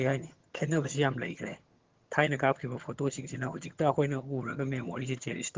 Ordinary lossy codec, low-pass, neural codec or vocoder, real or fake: Opus, 16 kbps; 7.2 kHz; vocoder, 22.05 kHz, 80 mel bands, HiFi-GAN; fake